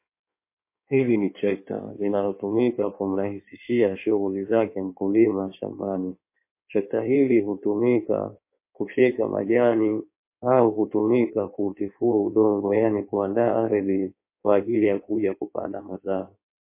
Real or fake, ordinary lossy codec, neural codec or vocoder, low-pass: fake; MP3, 24 kbps; codec, 16 kHz in and 24 kHz out, 1.1 kbps, FireRedTTS-2 codec; 3.6 kHz